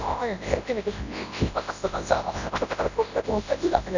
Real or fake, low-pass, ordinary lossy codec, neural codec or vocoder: fake; 7.2 kHz; none; codec, 24 kHz, 0.9 kbps, WavTokenizer, large speech release